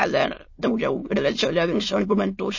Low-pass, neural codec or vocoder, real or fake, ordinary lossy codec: 7.2 kHz; autoencoder, 22.05 kHz, a latent of 192 numbers a frame, VITS, trained on many speakers; fake; MP3, 32 kbps